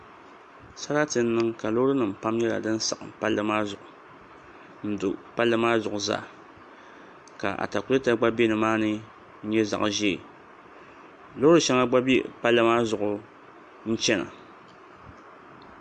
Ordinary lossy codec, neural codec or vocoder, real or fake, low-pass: MP3, 64 kbps; none; real; 10.8 kHz